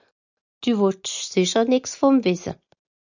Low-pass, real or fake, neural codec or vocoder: 7.2 kHz; real; none